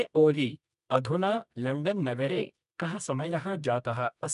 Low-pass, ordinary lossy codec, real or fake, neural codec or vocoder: 10.8 kHz; none; fake; codec, 24 kHz, 0.9 kbps, WavTokenizer, medium music audio release